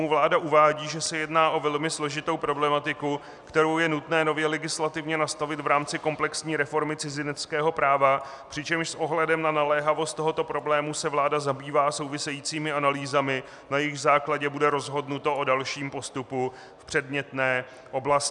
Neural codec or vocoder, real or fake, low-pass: none; real; 10.8 kHz